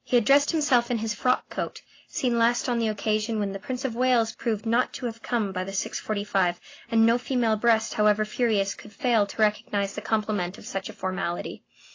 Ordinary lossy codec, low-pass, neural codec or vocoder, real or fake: AAC, 32 kbps; 7.2 kHz; none; real